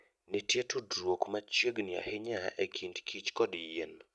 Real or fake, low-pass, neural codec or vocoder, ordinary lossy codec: real; none; none; none